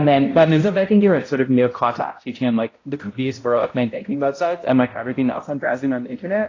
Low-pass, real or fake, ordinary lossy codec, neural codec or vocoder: 7.2 kHz; fake; AAC, 32 kbps; codec, 16 kHz, 0.5 kbps, X-Codec, HuBERT features, trained on balanced general audio